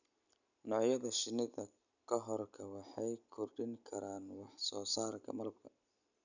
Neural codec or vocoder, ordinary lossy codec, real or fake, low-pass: none; none; real; 7.2 kHz